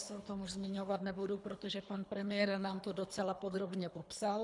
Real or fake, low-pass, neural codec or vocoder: fake; 10.8 kHz; codec, 24 kHz, 3 kbps, HILCodec